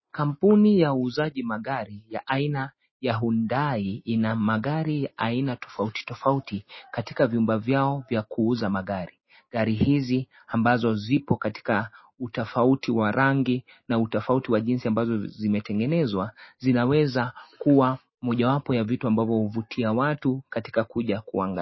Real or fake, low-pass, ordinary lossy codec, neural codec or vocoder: real; 7.2 kHz; MP3, 24 kbps; none